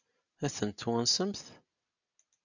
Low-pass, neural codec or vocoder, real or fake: 7.2 kHz; none; real